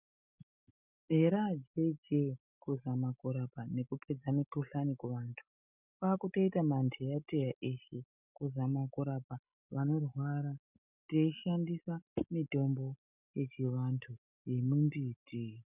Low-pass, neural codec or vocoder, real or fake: 3.6 kHz; none; real